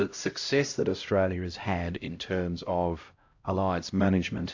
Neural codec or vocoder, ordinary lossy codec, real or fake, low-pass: codec, 16 kHz, 0.5 kbps, X-Codec, HuBERT features, trained on LibriSpeech; AAC, 48 kbps; fake; 7.2 kHz